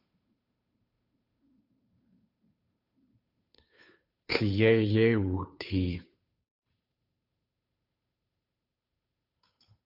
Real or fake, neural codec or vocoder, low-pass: fake; codec, 16 kHz, 2 kbps, FunCodec, trained on Chinese and English, 25 frames a second; 5.4 kHz